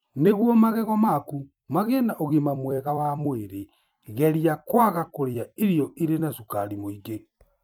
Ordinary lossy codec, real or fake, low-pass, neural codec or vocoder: none; fake; 19.8 kHz; vocoder, 44.1 kHz, 128 mel bands every 256 samples, BigVGAN v2